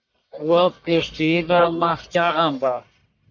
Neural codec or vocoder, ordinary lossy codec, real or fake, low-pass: codec, 44.1 kHz, 1.7 kbps, Pupu-Codec; MP3, 64 kbps; fake; 7.2 kHz